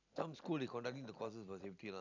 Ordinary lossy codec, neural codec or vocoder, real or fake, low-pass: none; none; real; 7.2 kHz